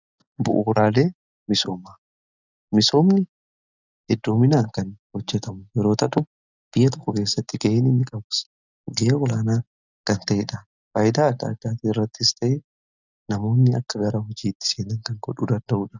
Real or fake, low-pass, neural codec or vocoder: real; 7.2 kHz; none